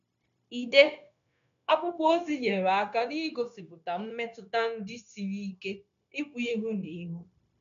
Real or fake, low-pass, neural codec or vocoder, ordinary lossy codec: fake; 7.2 kHz; codec, 16 kHz, 0.9 kbps, LongCat-Audio-Codec; none